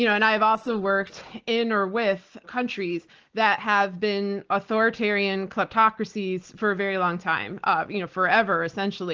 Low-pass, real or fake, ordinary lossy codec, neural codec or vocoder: 7.2 kHz; real; Opus, 16 kbps; none